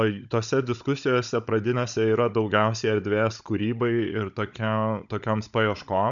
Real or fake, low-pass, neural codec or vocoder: fake; 7.2 kHz; codec, 16 kHz, 16 kbps, FunCodec, trained on Chinese and English, 50 frames a second